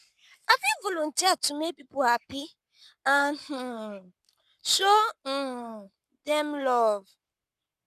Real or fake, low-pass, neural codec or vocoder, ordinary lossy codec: fake; 14.4 kHz; codec, 44.1 kHz, 7.8 kbps, Pupu-Codec; none